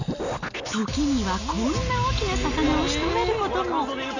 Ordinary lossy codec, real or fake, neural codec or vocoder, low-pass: none; real; none; 7.2 kHz